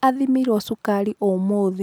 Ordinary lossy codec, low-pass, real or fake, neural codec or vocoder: none; none; real; none